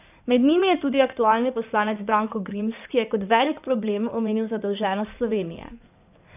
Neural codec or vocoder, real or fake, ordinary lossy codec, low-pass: codec, 16 kHz in and 24 kHz out, 2.2 kbps, FireRedTTS-2 codec; fake; none; 3.6 kHz